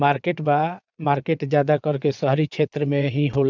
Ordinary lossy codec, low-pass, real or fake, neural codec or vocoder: none; 7.2 kHz; fake; vocoder, 22.05 kHz, 80 mel bands, WaveNeXt